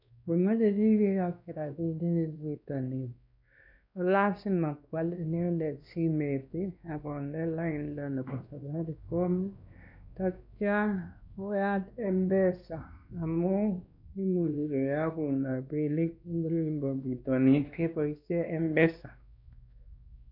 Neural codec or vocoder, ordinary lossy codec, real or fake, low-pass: codec, 16 kHz, 2 kbps, X-Codec, WavLM features, trained on Multilingual LibriSpeech; none; fake; 5.4 kHz